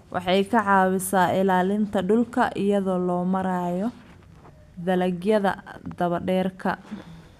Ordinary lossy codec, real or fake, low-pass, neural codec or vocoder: none; real; 14.4 kHz; none